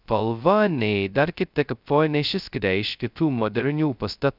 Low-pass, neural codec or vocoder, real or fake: 5.4 kHz; codec, 16 kHz, 0.2 kbps, FocalCodec; fake